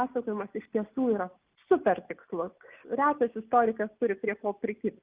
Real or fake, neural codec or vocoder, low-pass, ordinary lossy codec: real; none; 3.6 kHz; Opus, 32 kbps